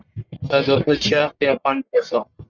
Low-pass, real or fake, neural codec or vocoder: 7.2 kHz; fake; codec, 44.1 kHz, 1.7 kbps, Pupu-Codec